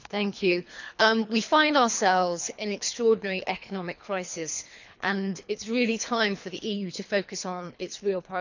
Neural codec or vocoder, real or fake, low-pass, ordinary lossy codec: codec, 24 kHz, 3 kbps, HILCodec; fake; 7.2 kHz; none